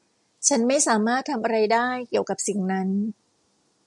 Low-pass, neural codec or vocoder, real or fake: 10.8 kHz; none; real